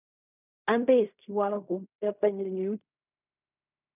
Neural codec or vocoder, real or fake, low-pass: codec, 16 kHz in and 24 kHz out, 0.4 kbps, LongCat-Audio-Codec, fine tuned four codebook decoder; fake; 3.6 kHz